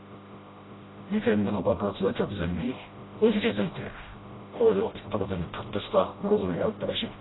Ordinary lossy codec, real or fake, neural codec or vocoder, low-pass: AAC, 16 kbps; fake; codec, 16 kHz, 0.5 kbps, FreqCodec, smaller model; 7.2 kHz